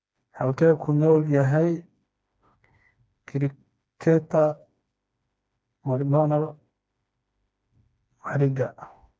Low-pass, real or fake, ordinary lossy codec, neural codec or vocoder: none; fake; none; codec, 16 kHz, 2 kbps, FreqCodec, smaller model